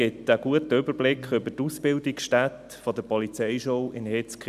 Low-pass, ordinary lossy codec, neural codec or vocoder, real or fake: 14.4 kHz; none; none; real